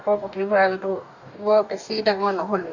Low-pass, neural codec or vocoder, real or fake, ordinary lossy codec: 7.2 kHz; codec, 44.1 kHz, 2.6 kbps, DAC; fake; none